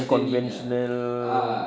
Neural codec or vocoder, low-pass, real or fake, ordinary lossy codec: none; none; real; none